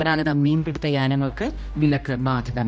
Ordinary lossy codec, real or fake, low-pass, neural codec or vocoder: none; fake; none; codec, 16 kHz, 1 kbps, X-Codec, HuBERT features, trained on general audio